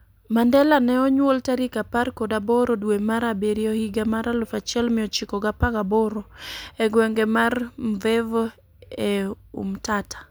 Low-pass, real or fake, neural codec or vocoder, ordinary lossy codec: none; real; none; none